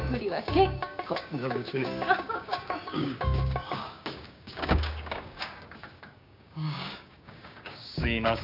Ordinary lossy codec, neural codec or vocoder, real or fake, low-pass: none; codec, 16 kHz, 6 kbps, DAC; fake; 5.4 kHz